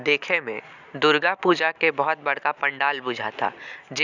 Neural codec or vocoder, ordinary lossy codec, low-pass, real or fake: none; none; 7.2 kHz; real